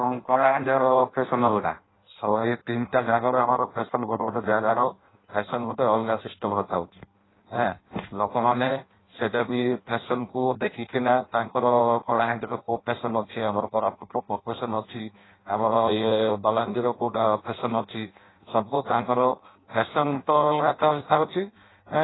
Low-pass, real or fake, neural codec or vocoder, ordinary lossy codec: 7.2 kHz; fake; codec, 16 kHz in and 24 kHz out, 0.6 kbps, FireRedTTS-2 codec; AAC, 16 kbps